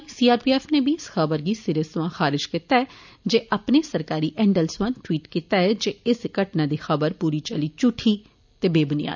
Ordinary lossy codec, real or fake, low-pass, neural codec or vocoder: none; real; 7.2 kHz; none